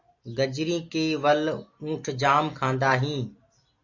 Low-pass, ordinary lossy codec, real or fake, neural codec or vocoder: 7.2 kHz; AAC, 48 kbps; real; none